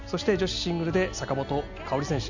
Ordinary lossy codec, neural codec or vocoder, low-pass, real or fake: none; none; 7.2 kHz; real